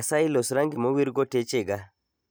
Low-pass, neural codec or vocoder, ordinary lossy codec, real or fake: none; none; none; real